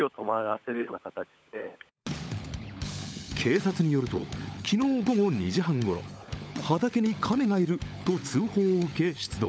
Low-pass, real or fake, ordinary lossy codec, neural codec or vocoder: none; fake; none; codec, 16 kHz, 16 kbps, FunCodec, trained on LibriTTS, 50 frames a second